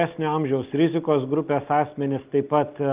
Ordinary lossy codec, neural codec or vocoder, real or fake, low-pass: Opus, 24 kbps; none; real; 3.6 kHz